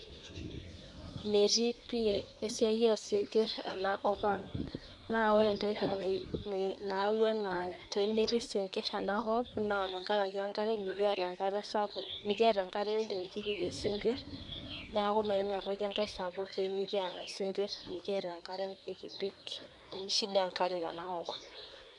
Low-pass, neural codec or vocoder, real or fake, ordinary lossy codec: 10.8 kHz; codec, 24 kHz, 1 kbps, SNAC; fake; MP3, 96 kbps